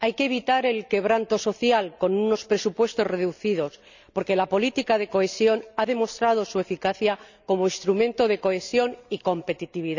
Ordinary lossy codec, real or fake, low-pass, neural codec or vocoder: none; real; 7.2 kHz; none